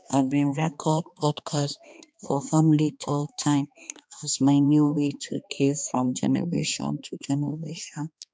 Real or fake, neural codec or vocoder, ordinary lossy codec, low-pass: fake; codec, 16 kHz, 2 kbps, X-Codec, HuBERT features, trained on balanced general audio; none; none